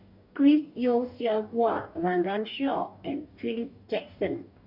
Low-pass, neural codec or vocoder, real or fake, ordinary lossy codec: 5.4 kHz; codec, 44.1 kHz, 2.6 kbps, DAC; fake; none